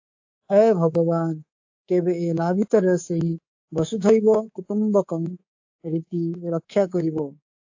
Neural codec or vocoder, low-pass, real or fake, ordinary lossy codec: codec, 24 kHz, 3.1 kbps, DualCodec; 7.2 kHz; fake; AAC, 48 kbps